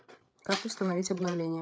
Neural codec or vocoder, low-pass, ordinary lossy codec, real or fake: codec, 16 kHz, 8 kbps, FreqCodec, larger model; none; none; fake